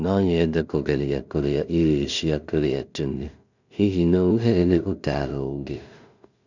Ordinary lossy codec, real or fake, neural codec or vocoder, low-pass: none; fake; codec, 16 kHz in and 24 kHz out, 0.4 kbps, LongCat-Audio-Codec, two codebook decoder; 7.2 kHz